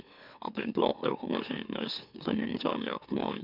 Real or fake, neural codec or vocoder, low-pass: fake; autoencoder, 44.1 kHz, a latent of 192 numbers a frame, MeloTTS; 5.4 kHz